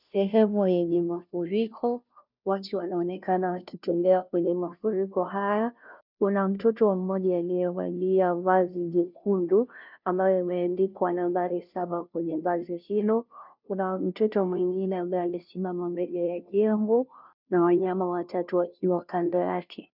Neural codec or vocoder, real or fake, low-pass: codec, 16 kHz, 0.5 kbps, FunCodec, trained on Chinese and English, 25 frames a second; fake; 5.4 kHz